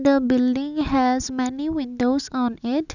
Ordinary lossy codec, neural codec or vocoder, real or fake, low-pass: none; none; real; 7.2 kHz